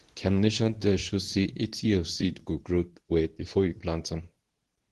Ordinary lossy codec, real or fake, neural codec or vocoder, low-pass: Opus, 16 kbps; fake; codec, 24 kHz, 0.9 kbps, WavTokenizer, medium speech release version 2; 10.8 kHz